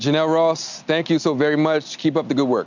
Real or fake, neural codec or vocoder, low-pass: real; none; 7.2 kHz